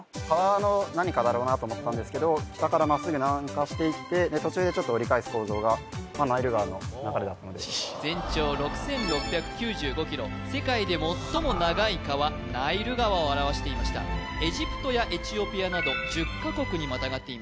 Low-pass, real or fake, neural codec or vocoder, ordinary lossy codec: none; real; none; none